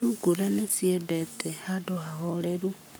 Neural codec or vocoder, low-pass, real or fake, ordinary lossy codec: codec, 44.1 kHz, 7.8 kbps, Pupu-Codec; none; fake; none